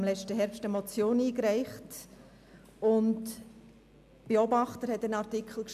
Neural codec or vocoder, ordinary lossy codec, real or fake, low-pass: none; MP3, 96 kbps; real; 14.4 kHz